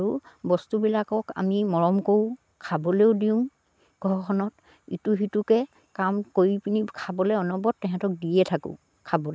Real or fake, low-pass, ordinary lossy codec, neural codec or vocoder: real; none; none; none